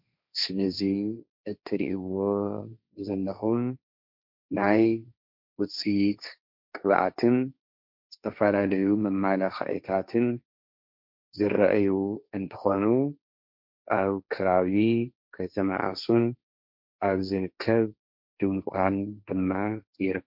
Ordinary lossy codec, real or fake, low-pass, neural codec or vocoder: MP3, 48 kbps; fake; 5.4 kHz; codec, 16 kHz, 1.1 kbps, Voila-Tokenizer